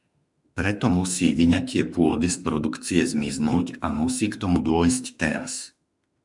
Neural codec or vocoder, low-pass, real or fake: autoencoder, 48 kHz, 32 numbers a frame, DAC-VAE, trained on Japanese speech; 10.8 kHz; fake